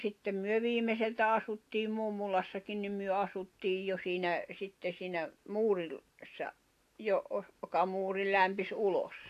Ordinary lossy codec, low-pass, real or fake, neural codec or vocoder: AAC, 64 kbps; 14.4 kHz; real; none